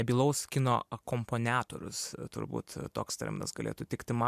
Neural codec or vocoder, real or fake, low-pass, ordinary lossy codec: none; real; 14.4 kHz; MP3, 96 kbps